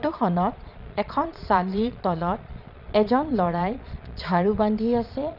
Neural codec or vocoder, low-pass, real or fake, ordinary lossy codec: vocoder, 22.05 kHz, 80 mel bands, Vocos; 5.4 kHz; fake; none